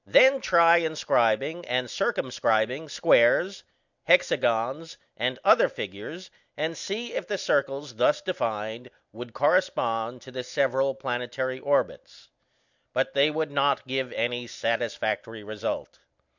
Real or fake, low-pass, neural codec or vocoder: real; 7.2 kHz; none